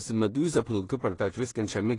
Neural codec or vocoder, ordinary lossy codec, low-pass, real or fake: codec, 16 kHz in and 24 kHz out, 0.4 kbps, LongCat-Audio-Codec, two codebook decoder; AAC, 32 kbps; 10.8 kHz; fake